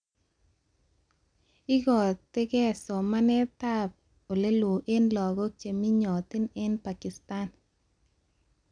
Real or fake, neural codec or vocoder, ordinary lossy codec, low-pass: real; none; none; 9.9 kHz